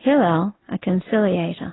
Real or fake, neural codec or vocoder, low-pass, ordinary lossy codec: real; none; 7.2 kHz; AAC, 16 kbps